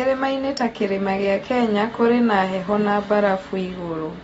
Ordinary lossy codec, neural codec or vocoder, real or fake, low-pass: AAC, 24 kbps; none; real; 19.8 kHz